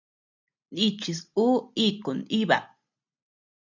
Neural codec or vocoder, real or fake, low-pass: none; real; 7.2 kHz